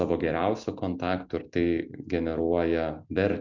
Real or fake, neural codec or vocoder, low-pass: real; none; 7.2 kHz